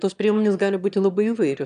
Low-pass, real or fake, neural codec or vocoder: 9.9 kHz; fake; autoencoder, 22.05 kHz, a latent of 192 numbers a frame, VITS, trained on one speaker